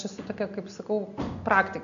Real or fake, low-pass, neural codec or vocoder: real; 7.2 kHz; none